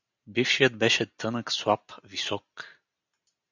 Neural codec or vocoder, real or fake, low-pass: none; real; 7.2 kHz